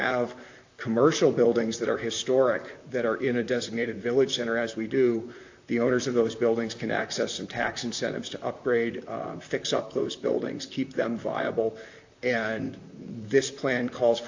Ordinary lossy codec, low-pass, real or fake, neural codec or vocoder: AAC, 48 kbps; 7.2 kHz; fake; vocoder, 44.1 kHz, 128 mel bands, Pupu-Vocoder